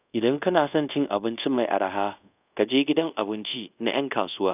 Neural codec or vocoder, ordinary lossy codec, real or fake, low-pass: codec, 24 kHz, 0.5 kbps, DualCodec; none; fake; 3.6 kHz